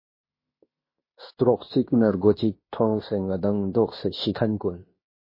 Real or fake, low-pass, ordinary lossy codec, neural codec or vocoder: fake; 5.4 kHz; MP3, 24 kbps; codec, 16 kHz in and 24 kHz out, 0.9 kbps, LongCat-Audio-Codec, fine tuned four codebook decoder